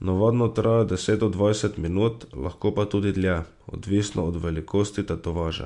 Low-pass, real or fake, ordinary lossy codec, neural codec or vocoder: 10.8 kHz; real; MP3, 64 kbps; none